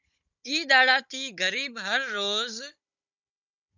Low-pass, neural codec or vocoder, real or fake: 7.2 kHz; codec, 16 kHz, 16 kbps, FunCodec, trained on Chinese and English, 50 frames a second; fake